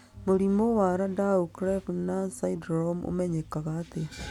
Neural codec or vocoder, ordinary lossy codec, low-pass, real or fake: none; none; 19.8 kHz; real